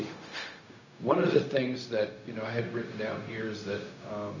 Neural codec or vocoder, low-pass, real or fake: codec, 16 kHz, 0.4 kbps, LongCat-Audio-Codec; 7.2 kHz; fake